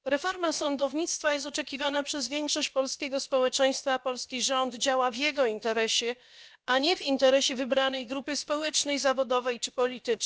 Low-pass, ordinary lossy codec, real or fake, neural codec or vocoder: none; none; fake; codec, 16 kHz, about 1 kbps, DyCAST, with the encoder's durations